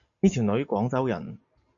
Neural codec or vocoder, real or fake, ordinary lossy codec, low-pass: none; real; AAC, 64 kbps; 7.2 kHz